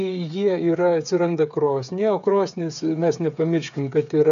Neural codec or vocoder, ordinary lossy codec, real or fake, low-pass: codec, 16 kHz, 8 kbps, FreqCodec, smaller model; AAC, 96 kbps; fake; 7.2 kHz